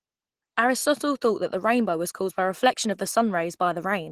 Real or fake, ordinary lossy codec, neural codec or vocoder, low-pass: real; Opus, 16 kbps; none; 14.4 kHz